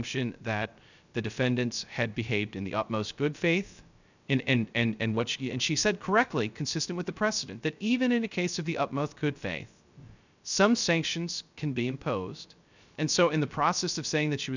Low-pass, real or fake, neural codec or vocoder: 7.2 kHz; fake; codec, 16 kHz, 0.3 kbps, FocalCodec